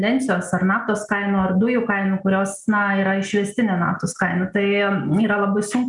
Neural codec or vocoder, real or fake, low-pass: none; real; 10.8 kHz